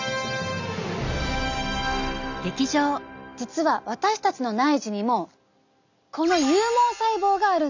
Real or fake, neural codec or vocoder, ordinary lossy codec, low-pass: real; none; none; 7.2 kHz